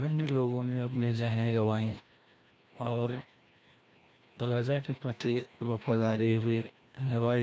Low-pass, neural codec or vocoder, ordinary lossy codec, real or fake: none; codec, 16 kHz, 1 kbps, FreqCodec, larger model; none; fake